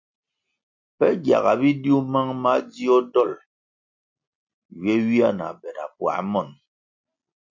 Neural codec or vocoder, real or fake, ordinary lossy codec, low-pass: none; real; MP3, 48 kbps; 7.2 kHz